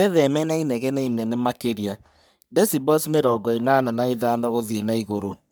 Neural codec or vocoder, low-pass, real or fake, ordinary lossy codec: codec, 44.1 kHz, 3.4 kbps, Pupu-Codec; none; fake; none